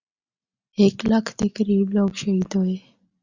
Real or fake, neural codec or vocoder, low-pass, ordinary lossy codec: real; none; 7.2 kHz; Opus, 64 kbps